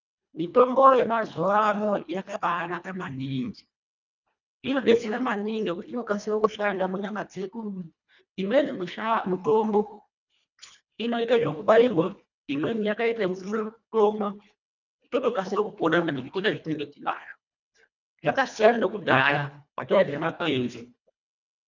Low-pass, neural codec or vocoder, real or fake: 7.2 kHz; codec, 24 kHz, 1.5 kbps, HILCodec; fake